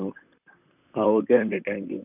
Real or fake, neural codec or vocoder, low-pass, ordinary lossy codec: fake; vocoder, 44.1 kHz, 128 mel bands, Pupu-Vocoder; 3.6 kHz; none